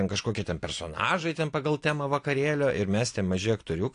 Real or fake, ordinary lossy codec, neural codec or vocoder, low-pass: real; AAC, 48 kbps; none; 9.9 kHz